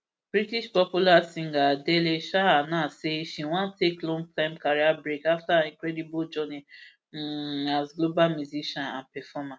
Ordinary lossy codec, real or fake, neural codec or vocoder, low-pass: none; real; none; none